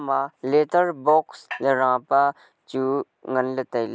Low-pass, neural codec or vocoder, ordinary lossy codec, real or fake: none; none; none; real